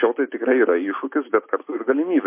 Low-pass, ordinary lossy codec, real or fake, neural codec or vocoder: 3.6 kHz; MP3, 24 kbps; real; none